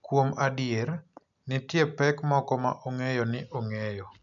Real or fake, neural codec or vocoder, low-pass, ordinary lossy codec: real; none; 7.2 kHz; none